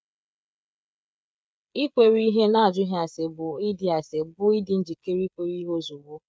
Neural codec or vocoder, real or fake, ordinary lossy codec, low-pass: codec, 16 kHz, 16 kbps, FreqCodec, smaller model; fake; none; none